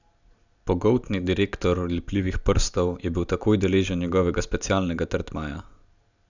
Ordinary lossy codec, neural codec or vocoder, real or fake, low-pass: none; none; real; 7.2 kHz